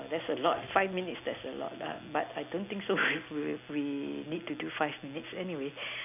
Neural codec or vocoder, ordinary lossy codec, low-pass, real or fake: none; none; 3.6 kHz; real